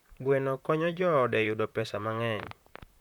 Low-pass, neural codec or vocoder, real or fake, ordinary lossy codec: 19.8 kHz; vocoder, 44.1 kHz, 128 mel bands, Pupu-Vocoder; fake; none